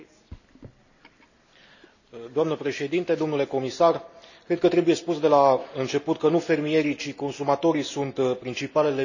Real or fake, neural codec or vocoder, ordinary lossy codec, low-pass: real; none; none; 7.2 kHz